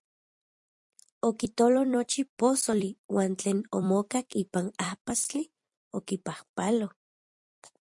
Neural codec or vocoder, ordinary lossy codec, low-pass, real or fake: vocoder, 44.1 kHz, 128 mel bands every 256 samples, BigVGAN v2; MP3, 64 kbps; 10.8 kHz; fake